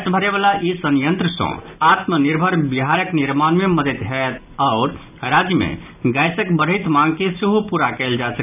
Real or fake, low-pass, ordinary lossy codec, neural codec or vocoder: real; 3.6 kHz; none; none